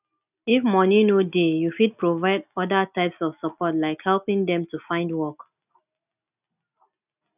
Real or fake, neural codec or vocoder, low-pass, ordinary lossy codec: real; none; 3.6 kHz; none